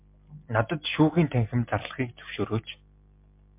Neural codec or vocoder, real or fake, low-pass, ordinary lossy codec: none; real; 3.6 kHz; MP3, 32 kbps